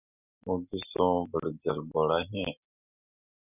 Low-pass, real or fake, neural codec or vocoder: 3.6 kHz; real; none